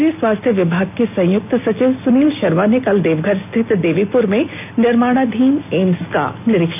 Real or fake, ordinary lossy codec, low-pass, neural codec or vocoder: real; none; 3.6 kHz; none